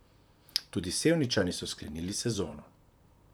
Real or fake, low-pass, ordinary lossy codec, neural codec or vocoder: fake; none; none; vocoder, 44.1 kHz, 128 mel bands, Pupu-Vocoder